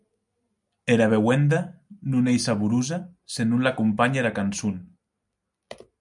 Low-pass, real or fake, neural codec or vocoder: 10.8 kHz; real; none